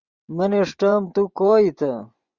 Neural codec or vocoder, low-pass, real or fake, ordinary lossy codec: vocoder, 24 kHz, 100 mel bands, Vocos; 7.2 kHz; fake; Opus, 64 kbps